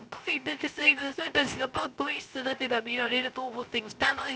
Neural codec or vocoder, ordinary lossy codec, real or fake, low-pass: codec, 16 kHz, 0.3 kbps, FocalCodec; none; fake; none